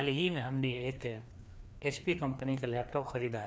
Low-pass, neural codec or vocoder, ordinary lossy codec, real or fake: none; codec, 16 kHz, 2 kbps, FreqCodec, larger model; none; fake